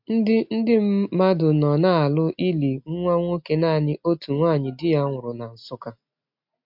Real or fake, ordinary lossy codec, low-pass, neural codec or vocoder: real; MP3, 48 kbps; 5.4 kHz; none